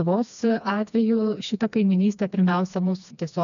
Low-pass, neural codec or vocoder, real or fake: 7.2 kHz; codec, 16 kHz, 2 kbps, FreqCodec, smaller model; fake